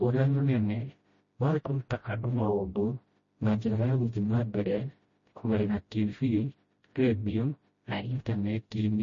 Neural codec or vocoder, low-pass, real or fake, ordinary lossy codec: codec, 16 kHz, 0.5 kbps, FreqCodec, smaller model; 7.2 kHz; fake; MP3, 32 kbps